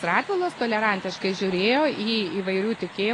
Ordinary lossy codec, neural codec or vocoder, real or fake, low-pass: AAC, 32 kbps; none; real; 10.8 kHz